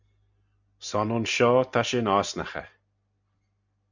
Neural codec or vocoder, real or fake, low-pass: none; real; 7.2 kHz